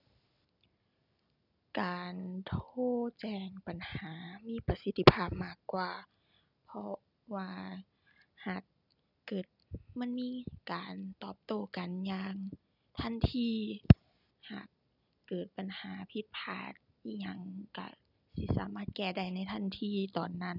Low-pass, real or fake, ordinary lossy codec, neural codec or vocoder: 5.4 kHz; real; none; none